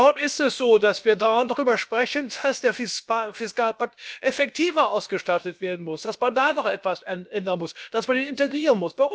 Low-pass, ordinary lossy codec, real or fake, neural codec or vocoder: none; none; fake; codec, 16 kHz, about 1 kbps, DyCAST, with the encoder's durations